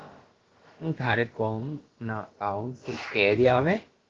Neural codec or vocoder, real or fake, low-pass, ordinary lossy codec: codec, 16 kHz, about 1 kbps, DyCAST, with the encoder's durations; fake; 7.2 kHz; Opus, 16 kbps